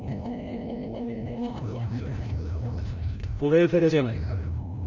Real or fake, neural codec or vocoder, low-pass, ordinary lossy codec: fake; codec, 16 kHz, 0.5 kbps, FreqCodec, larger model; 7.2 kHz; none